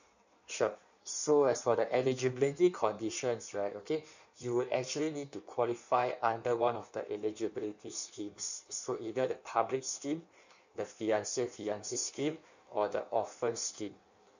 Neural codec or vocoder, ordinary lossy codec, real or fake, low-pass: codec, 16 kHz in and 24 kHz out, 1.1 kbps, FireRedTTS-2 codec; none; fake; 7.2 kHz